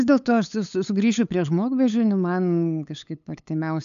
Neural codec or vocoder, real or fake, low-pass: codec, 16 kHz, 8 kbps, FunCodec, trained on LibriTTS, 25 frames a second; fake; 7.2 kHz